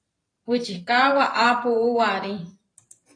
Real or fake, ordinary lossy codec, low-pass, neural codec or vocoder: fake; AAC, 32 kbps; 9.9 kHz; vocoder, 44.1 kHz, 128 mel bands every 512 samples, BigVGAN v2